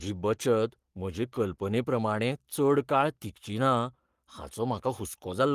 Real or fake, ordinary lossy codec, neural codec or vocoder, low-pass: fake; Opus, 24 kbps; codec, 44.1 kHz, 7.8 kbps, Pupu-Codec; 14.4 kHz